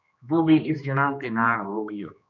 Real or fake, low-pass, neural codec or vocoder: fake; 7.2 kHz; codec, 16 kHz, 2 kbps, X-Codec, HuBERT features, trained on general audio